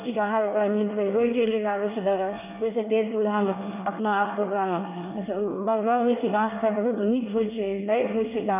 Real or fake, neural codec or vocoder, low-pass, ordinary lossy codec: fake; codec, 24 kHz, 1 kbps, SNAC; 3.6 kHz; none